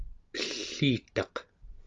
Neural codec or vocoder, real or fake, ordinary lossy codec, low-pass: none; real; Opus, 32 kbps; 7.2 kHz